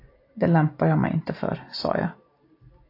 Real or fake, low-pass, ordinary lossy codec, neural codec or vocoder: real; 5.4 kHz; AAC, 32 kbps; none